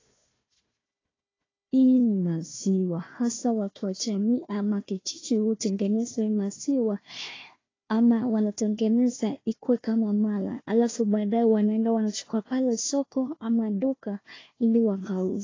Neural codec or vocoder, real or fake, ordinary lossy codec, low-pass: codec, 16 kHz, 1 kbps, FunCodec, trained on Chinese and English, 50 frames a second; fake; AAC, 32 kbps; 7.2 kHz